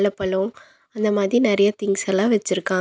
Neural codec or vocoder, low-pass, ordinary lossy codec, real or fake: none; none; none; real